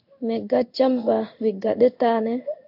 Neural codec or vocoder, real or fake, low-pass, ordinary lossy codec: codec, 16 kHz in and 24 kHz out, 1 kbps, XY-Tokenizer; fake; 5.4 kHz; AAC, 48 kbps